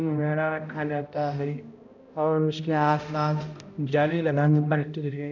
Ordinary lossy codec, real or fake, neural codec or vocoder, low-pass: none; fake; codec, 16 kHz, 0.5 kbps, X-Codec, HuBERT features, trained on balanced general audio; 7.2 kHz